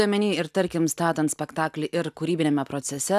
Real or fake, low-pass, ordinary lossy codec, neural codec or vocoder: real; 14.4 kHz; AAC, 96 kbps; none